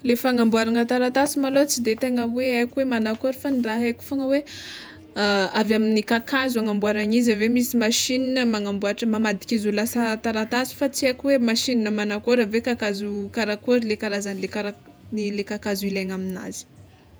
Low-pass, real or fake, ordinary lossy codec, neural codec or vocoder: none; fake; none; vocoder, 48 kHz, 128 mel bands, Vocos